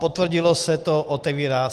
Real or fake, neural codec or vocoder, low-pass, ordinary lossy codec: real; none; 14.4 kHz; Opus, 24 kbps